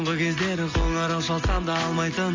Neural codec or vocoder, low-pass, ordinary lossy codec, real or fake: none; 7.2 kHz; MP3, 48 kbps; real